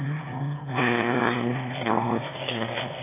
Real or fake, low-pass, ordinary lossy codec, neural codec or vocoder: fake; 3.6 kHz; AAC, 32 kbps; autoencoder, 22.05 kHz, a latent of 192 numbers a frame, VITS, trained on one speaker